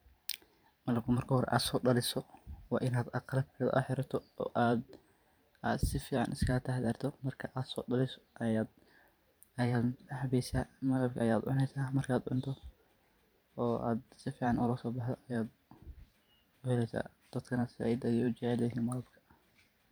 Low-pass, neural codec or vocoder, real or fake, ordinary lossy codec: none; vocoder, 44.1 kHz, 128 mel bands every 512 samples, BigVGAN v2; fake; none